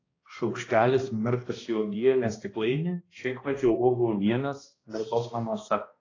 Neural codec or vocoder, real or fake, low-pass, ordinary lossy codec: codec, 16 kHz, 1 kbps, X-Codec, HuBERT features, trained on balanced general audio; fake; 7.2 kHz; AAC, 32 kbps